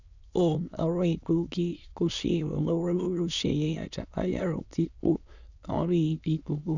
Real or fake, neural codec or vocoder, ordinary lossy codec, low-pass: fake; autoencoder, 22.05 kHz, a latent of 192 numbers a frame, VITS, trained on many speakers; none; 7.2 kHz